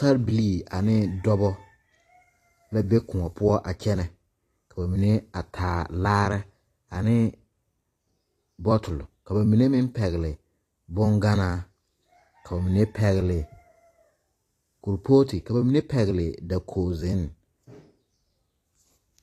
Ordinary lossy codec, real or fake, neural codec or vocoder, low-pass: AAC, 48 kbps; fake; vocoder, 44.1 kHz, 128 mel bands every 256 samples, BigVGAN v2; 14.4 kHz